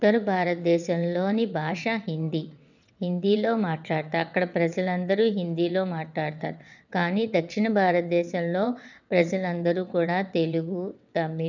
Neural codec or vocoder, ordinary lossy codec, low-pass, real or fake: vocoder, 22.05 kHz, 80 mel bands, WaveNeXt; none; 7.2 kHz; fake